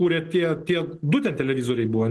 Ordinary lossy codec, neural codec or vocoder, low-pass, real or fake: Opus, 16 kbps; none; 10.8 kHz; real